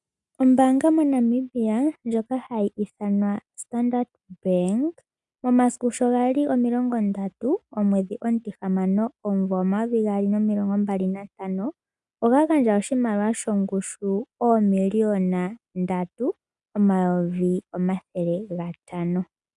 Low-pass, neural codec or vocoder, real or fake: 10.8 kHz; none; real